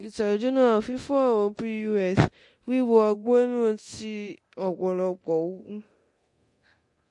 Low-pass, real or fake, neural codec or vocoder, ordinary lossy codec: 10.8 kHz; fake; codec, 24 kHz, 0.9 kbps, DualCodec; MP3, 48 kbps